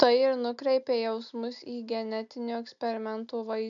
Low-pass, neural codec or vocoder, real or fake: 7.2 kHz; none; real